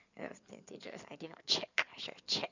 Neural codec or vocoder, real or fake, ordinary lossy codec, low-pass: codec, 16 kHz in and 24 kHz out, 2.2 kbps, FireRedTTS-2 codec; fake; none; 7.2 kHz